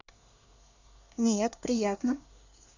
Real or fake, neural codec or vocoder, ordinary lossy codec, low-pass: fake; codec, 24 kHz, 1 kbps, SNAC; none; 7.2 kHz